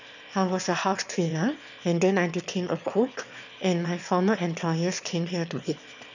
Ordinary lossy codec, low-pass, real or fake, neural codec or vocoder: none; 7.2 kHz; fake; autoencoder, 22.05 kHz, a latent of 192 numbers a frame, VITS, trained on one speaker